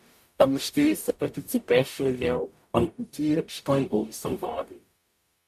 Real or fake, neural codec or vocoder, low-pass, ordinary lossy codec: fake; codec, 44.1 kHz, 0.9 kbps, DAC; 14.4 kHz; MP3, 96 kbps